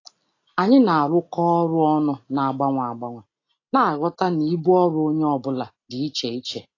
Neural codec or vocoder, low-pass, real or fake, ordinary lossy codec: none; 7.2 kHz; real; AAC, 32 kbps